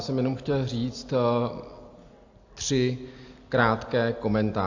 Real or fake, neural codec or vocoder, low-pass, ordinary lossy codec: real; none; 7.2 kHz; AAC, 48 kbps